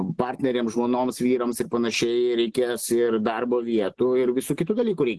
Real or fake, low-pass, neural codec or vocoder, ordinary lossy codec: real; 10.8 kHz; none; Opus, 16 kbps